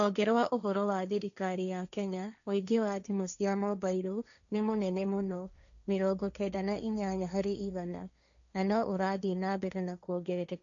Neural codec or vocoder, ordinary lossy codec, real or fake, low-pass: codec, 16 kHz, 1.1 kbps, Voila-Tokenizer; none; fake; 7.2 kHz